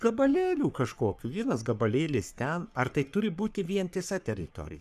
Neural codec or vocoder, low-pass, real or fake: codec, 44.1 kHz, 3.4 kbps, Pupu-Codec; 14.4 kHz; fake